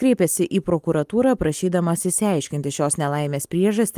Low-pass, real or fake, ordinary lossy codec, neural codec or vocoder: 14.4 kHz; real; Opus, 32 kbps; none